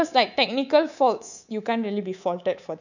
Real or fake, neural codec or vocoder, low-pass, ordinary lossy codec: fake; codec, 24 kHz, 3.1 kbps, DualCodec; 7.2 kHz; none